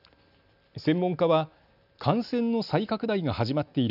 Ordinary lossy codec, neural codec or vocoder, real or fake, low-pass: none; none; real; 5.4 kHz